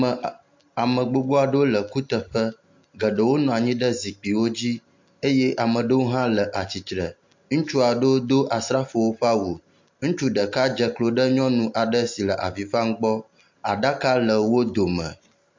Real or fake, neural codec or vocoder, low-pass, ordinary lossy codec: real; none; 7.2 kHz; MP3, 48 kbps